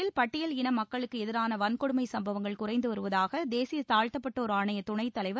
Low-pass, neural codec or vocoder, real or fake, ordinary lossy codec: none; none; real; none